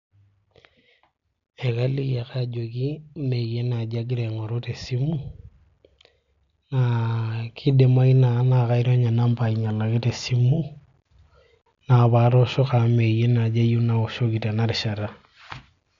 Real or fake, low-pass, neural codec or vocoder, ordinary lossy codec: real; 7.2 kHz; none; MP3, 64 kbps